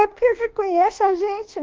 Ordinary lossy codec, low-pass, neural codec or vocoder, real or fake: Opus, 16 kbps; 7.2 kHz; codec, 24 kHz, 1.2 kbps, DualCodec; fake